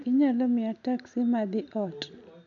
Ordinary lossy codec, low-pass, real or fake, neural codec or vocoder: MP3, 96 kbps; 7.2 kHz; real; none